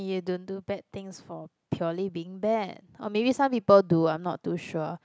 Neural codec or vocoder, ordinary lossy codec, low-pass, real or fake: none; none; none; real